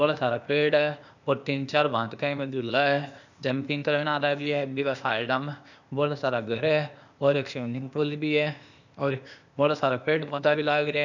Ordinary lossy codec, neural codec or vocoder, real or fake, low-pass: none; codec, 16 kHz, 0.8 kbps, ZipCodec; fake; 7.2 kHz